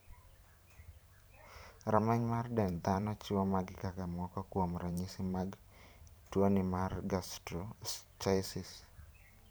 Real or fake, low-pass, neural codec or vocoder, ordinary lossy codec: fake; none; vocoder, 44.1 kHz, 128 mel bands every 512 samples, BigVGAN v2; none